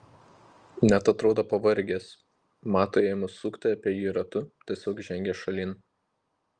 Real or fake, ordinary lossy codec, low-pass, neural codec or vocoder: real; Opus, 24 kbps; 9.9 kHz; none